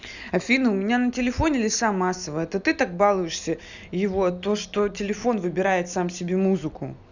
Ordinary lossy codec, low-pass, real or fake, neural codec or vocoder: none; 7.2 kHz; real; none